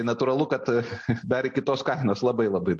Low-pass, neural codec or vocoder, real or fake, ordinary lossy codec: 10.8 kHz; none; real; MP3, 48 kbps